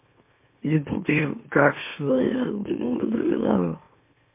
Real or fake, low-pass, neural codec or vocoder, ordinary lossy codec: fake; 3.6 kHz; autoencoder, 44.1 kHz, a latent of 192 numbers a frame, MeloTTS; MP3, 24 kbps